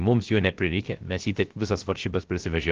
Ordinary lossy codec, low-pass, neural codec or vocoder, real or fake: Opus, 16 kbps; 7.2 kHz; codec, 16 kHz, 0.3 kbps, FocalCodec; fake